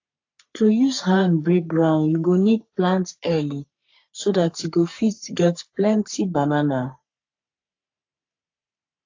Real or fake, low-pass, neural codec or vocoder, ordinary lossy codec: fake; 7.2 kHz; codec, 44.1 kHz, 3.4 kbps, Pupu-Codec; AAC, 48 kbps